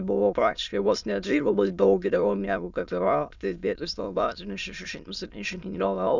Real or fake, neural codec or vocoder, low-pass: fake; autoencoder, 22.05 kHz, a latent of 192 numbers a frame, VITS, trained on many speakers; 7.2 kHz